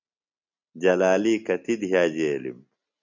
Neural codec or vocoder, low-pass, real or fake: none; 7.2 kHz; real